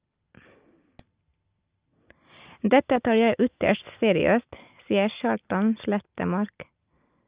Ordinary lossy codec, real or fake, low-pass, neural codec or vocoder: Opus, 24 kbps; real; 3.6 kHz; none